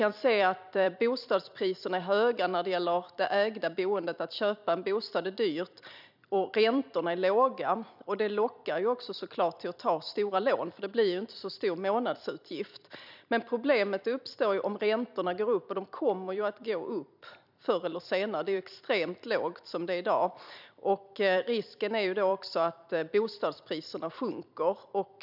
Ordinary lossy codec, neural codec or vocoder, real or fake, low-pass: none; vocoder, 44.1 kHz, 128 mel bands every 256 samples, BigVGAN v2; fake; 5.4 kHz